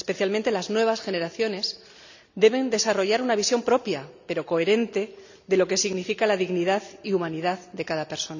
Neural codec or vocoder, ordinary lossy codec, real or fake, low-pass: none; none; real; 7.2 kHz